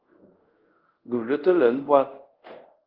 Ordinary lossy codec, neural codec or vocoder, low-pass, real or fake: Opus, 16 kbps; codec, 24 kHz, 0.5 kbps, DualCodec; 5.4 kHz; fake